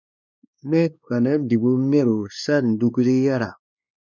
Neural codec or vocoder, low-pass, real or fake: codec, 16 kHz, 2 kbps, X-Codec, WavLM features, trained on Multilingual LibriSpeech; 7.2 kHz; fake